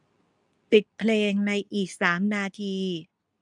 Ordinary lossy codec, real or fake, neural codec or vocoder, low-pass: none; fake; codec, 24 kHz, 0.9 kbps, WavTokenizer, medium speech release version 2; 10.8 kHz